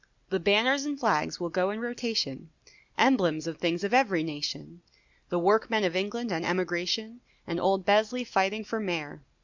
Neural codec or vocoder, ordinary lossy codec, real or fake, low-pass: none; Opus, 64 kbps; real; 7.2 kHz